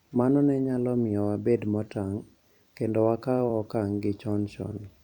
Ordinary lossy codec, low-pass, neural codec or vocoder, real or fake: none; 19.8 kHz; vocoder, 44.1 kHz, 128 mel bands every 256 samples, BigVGAN v2; fake